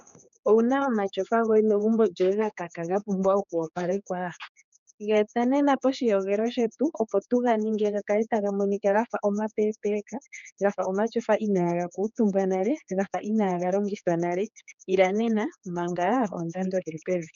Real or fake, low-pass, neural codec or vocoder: fake; 7.2 kHz; codec, 16 kHz, 4 kbps, X-Codec, HuBERT features, trained on general audio